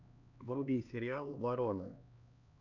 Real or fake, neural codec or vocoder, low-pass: fake; codec, 16 kHz, 2 kbps, X-Codec, HuBERT features, trained on LibriSpeech; 7.2 kHz